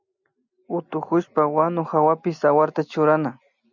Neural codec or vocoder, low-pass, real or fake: none; 7.2 kHz; real